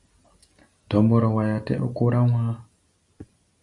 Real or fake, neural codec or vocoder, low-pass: real; none; 10.8 kHz